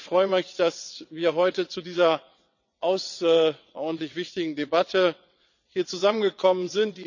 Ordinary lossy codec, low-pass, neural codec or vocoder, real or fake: none; 7.2 kHz; vocoder, 22.05 kHz, 80 mel bands, WaveNeXt; fake